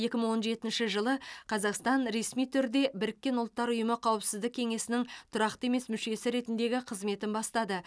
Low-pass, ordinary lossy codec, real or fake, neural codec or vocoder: none; none; real; none